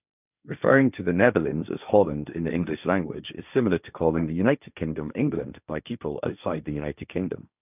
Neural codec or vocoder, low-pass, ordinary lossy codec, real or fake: codec, 16 kHz, 1.1 kbps, Voila-Tokenizer; 3.6 kHz; none; fake